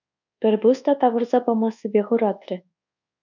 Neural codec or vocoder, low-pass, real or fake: codec, 24 kHz, 1.2 kbps, DualCodec; 7.2 kHz; fake